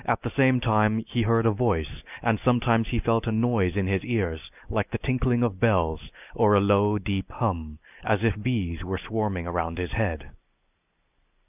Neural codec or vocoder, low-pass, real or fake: none; 3.6 kHz; real